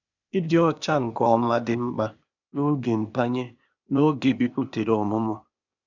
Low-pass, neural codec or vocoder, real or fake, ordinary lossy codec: 7.2 kHz; codec, 16 kHz, 0.8 kbps, ZipCodec; fake; none